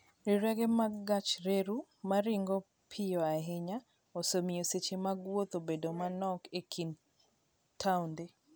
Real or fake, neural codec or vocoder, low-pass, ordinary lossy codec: real; none; none; none